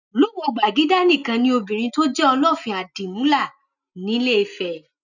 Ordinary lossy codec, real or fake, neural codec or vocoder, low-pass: none; real; none; 7.2 kHz